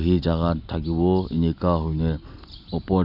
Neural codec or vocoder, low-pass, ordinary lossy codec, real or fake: none; 5.4 kHz; none; real